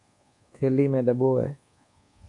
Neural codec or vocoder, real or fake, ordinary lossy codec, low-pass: codec, 24 kHz, 1.2 kbps, DualCodec; fake; MP3, 64 kbps; 10.8 kHz